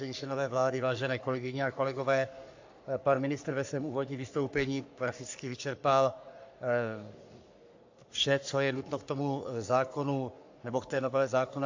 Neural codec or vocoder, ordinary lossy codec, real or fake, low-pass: codec, 44.1 kHz, 3.4 kbps, Pupu-Codec; AAC, 48 kbps; fake; 7.2 kHz